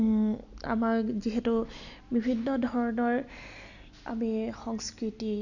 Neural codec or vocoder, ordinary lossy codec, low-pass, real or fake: none; none; 7.2 kHz; real